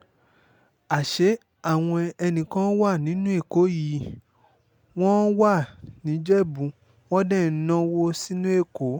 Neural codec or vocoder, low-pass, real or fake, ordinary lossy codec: none; 19.8 kHz; real; MP3, 96 kbps